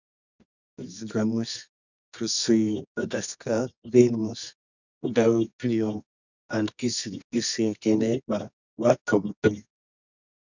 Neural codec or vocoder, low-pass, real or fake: codec, 24 kHz, 0.9 kbps, WavTokenizer, medium music audio release; 7.2 kHz; fake